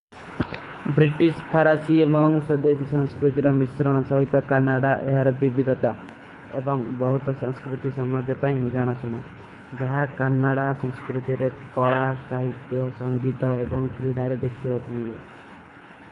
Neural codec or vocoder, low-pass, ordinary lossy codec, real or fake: codec, 24 kHz, 3 kbps, HILCodec; 10.8 kHz; none; fake